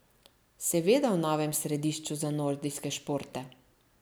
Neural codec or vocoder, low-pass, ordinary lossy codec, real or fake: none; none; none; real